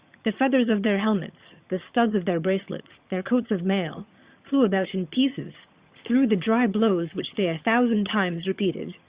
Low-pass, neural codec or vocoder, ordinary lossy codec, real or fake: 3.6 kHz; vocoder, 22.05 kHz, 80 mel bands, HiFi-GAN; Opus, 64 kbps; fake